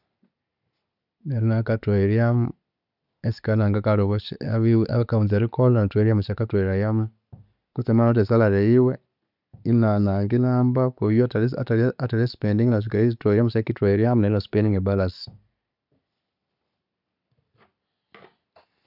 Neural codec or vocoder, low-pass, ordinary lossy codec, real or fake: none; 5.4 kHz; none; real